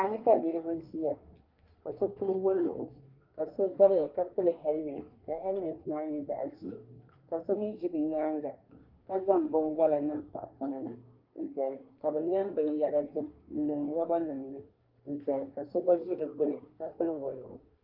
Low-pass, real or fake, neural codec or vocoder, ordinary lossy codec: 5.4 kHz; fake; codec, 24 kHz, 1 kbps, SNAC; Opus, 24 kbps